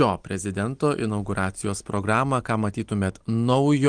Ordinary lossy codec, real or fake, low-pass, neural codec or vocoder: Opus, 24 kbps; real; 9.9 kHz; none